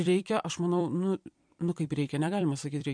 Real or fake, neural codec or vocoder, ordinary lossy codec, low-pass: fake; vocoder, 44.1 kHz, 128 mel bands every 256 samples, BigVGAN v2; MP3, 64 kbps; 9.9 kHz